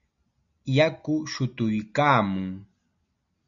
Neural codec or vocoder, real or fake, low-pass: none; real; 7.2 kHz